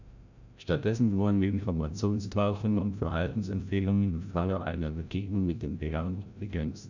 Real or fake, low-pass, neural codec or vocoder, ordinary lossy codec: fake; 7.2 kHz; codec, 16 kHz, 0.5 kbps, FreqCodec, larger model; none